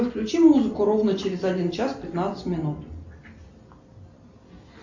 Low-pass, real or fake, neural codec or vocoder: 7.2 kHz; real; none